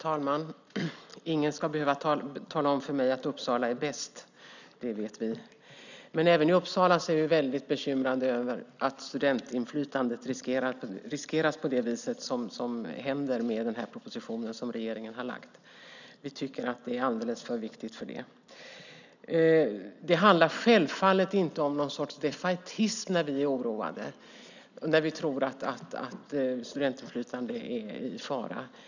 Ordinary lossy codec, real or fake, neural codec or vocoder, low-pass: none; real; none; 7.2 kHz